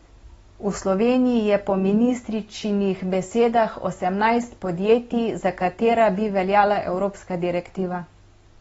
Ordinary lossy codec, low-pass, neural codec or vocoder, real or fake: AAC, 24 kbps; 19.8 kHz; none; real